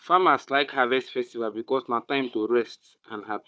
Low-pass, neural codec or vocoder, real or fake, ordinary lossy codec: none; codec, 16 kHz, 4 kbps, FunCodec, trained on Chinese and English, 50 frames a second; fake; none